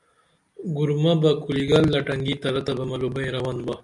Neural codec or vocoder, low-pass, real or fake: none; 10.8 kHz; real